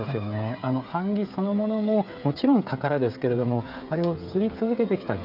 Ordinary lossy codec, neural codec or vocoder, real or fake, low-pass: none; codec, 16 kHz, 8 kbps, FreqCodec, smaller model; fake; 5.4 kHz